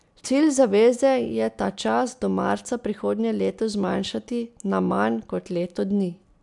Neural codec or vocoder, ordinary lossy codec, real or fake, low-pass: none; none; real; 10.8 kHz